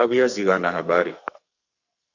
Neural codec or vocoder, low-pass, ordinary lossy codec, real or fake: codec, 44.1 kHz, 2.6 kbps, SNAC; 7.2 kHz; Opus, 64 kbps; fake